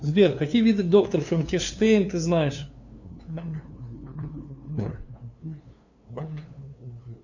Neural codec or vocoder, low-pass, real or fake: codec, 16 kHz, 2 kbps, FunCodec, trained on LibriTTS, 25 frames a second; 7.2 kHz; fake